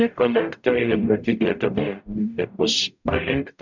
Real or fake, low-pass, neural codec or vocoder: fake; 7.2 kHz; codec, 44.1 kHz, 0.9 kbps, DAC